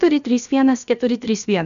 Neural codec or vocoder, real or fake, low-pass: codec, 16 kHz, about 1 kbps, DyCAST, with the encoder's durations; fake; 7.2 kHz